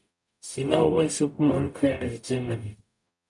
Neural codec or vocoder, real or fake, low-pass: codec, 44.1 kHz, 0.9 kbps, DAC; fake; 10.8 kHz